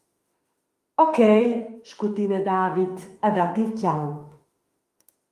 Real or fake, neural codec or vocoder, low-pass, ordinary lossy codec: fake; autoencoder, 48 kHz, 32 numbers a frame, DAC-VAE, trained on Japanese speech; 14.4 kHz; Opus, 32 kbps